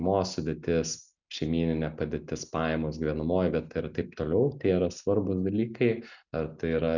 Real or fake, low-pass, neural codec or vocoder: real; 7.2 kHz; none